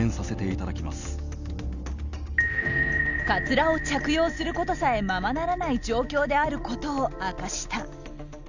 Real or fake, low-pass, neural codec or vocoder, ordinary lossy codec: real; 7.2 kHz; none; none